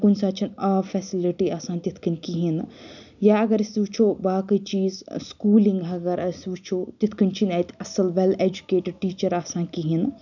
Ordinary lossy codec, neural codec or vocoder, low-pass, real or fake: none; none; 7.2 kHz; real